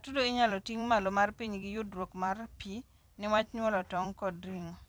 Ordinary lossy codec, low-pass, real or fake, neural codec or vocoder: none; none; fake; vocoder, 44.1 kHz, 128 mel bands every 256 samples, BigVGAN v2